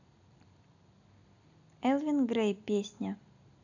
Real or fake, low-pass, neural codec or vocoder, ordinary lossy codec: real; 7.2 kHz; none; none